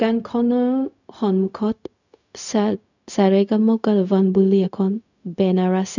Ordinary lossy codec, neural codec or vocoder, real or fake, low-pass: none; codec, 16 kHz, 0.4 kbps, LongCat-Audio-Codec; fake; 7.2 kHz